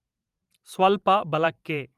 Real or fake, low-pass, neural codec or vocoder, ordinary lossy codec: fake; 14.4 kHz; vocoder, 44.1 kHz, 128 mel bands every 512 samples, BigVGAN v2; Opus, 32 kbps